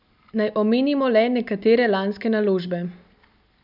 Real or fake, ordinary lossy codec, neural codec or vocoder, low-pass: real; none; none; 5.4 kHz